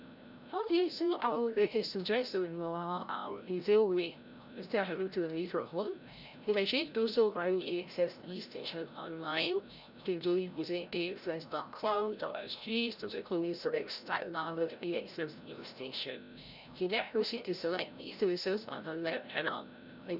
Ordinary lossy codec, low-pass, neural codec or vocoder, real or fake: none; 5.4 kHz; codec, 16 kHz, 0.5 kbps, FreqCodec, larger model; fake